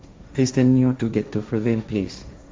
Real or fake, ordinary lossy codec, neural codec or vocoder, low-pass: fake; none; codec, 16 kHz, 1.1 kbps, Voila-Tokenizer; none